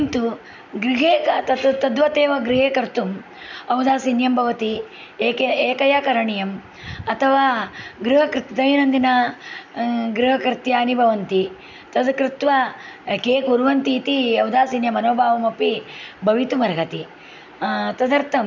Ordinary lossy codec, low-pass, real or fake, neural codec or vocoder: none; 7.2 kHz; real; none